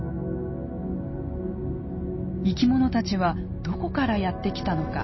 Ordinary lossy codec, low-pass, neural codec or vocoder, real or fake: MP3, 24 kbps; 7.2 kHz; none; real